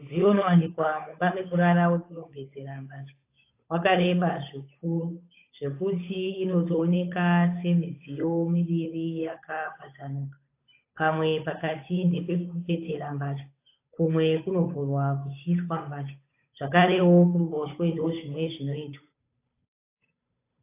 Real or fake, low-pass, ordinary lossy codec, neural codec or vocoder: fake; 3.6 kHz; AAC, 24 kbps; codec, 16 kHz, 8 kbps, FunCodec, trained on Chinese and English, 25 frames a second